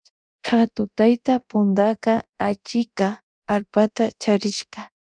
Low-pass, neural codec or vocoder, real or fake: 9.9 kHz; codec, 24 kHz, 0.9 kbps, DualCodec; fake